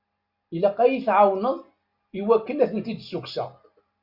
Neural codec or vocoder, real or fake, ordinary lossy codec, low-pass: none; real; Opus, 64 kbps; 5.4 kHz